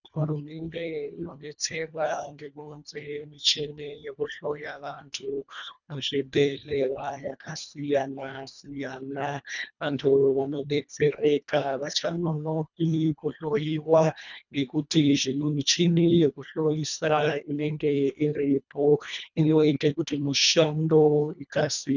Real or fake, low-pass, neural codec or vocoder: fake; 7.2 kHz; codec, 24 kHz, 1.5 kbps, HILCodec